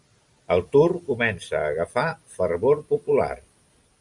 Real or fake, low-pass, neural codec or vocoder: real; 10.8 kHz; none